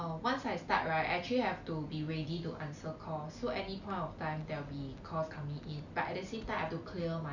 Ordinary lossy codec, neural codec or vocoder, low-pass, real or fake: none; none; 7.2 kHz; real